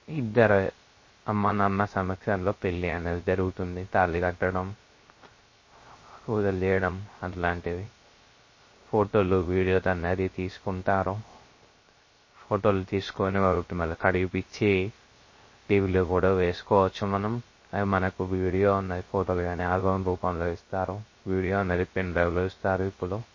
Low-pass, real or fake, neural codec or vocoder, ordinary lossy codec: 7.2 kHz; fake; codec, 16 kHz, 0.3 kbps, FocalCodec; MP3, 32 kbps